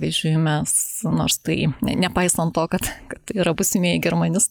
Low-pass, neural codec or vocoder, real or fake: 19.8 kHz; none; real